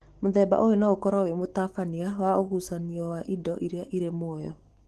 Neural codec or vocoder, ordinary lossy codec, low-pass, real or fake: autoencoder, 48 kHz, 128 numbers a frame, DAC-VAE, trained on Japanese speech; Opus, 16 kbps; 14.4 kHz; fake